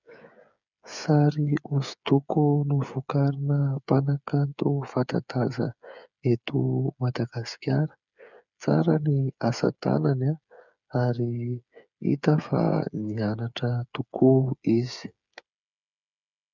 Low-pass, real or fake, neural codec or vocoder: 7.2 kHz; fake; codec, 16 kHz, 8 kbps, FreqCodec, smaller model